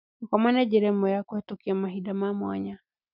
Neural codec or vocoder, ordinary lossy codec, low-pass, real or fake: none; none; 5.4 kHz; real